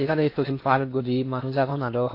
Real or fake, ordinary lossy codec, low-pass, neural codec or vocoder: fake; AAC, 32 kbps; 5.4 kHz; codec, 16 kHz in and 24 kHz out, 0.8 kbps, FocalCodec, streaming, 65536 codes